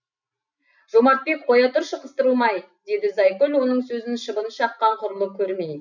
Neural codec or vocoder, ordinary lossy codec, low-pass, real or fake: none; none; 7.2 kHz; real